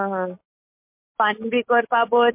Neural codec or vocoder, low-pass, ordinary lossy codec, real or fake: none; 3.6 kHz; MP3, 32 kbps; real